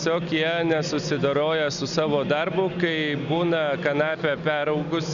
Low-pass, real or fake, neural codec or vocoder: 7.2 kHz; real; none